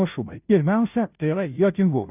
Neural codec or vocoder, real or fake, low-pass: codec, 16 kHz, 0.5 kbps, FunCodec, trained on Chinese and English, 25 frames a second; fake; 3.6 kHz